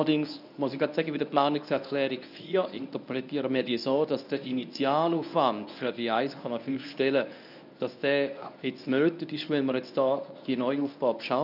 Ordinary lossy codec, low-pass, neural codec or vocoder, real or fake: none; 5.4 kHz; codec, 24 kHz, 0.9 kbps, WavTokenizer, medium speech release version 1; fake